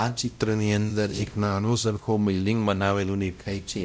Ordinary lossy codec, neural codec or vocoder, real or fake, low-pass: none; codec, 16 kHz, 0.5 kbps, X-Codec, WavLM features, trained on Multilingual LibriSpeech; fake; none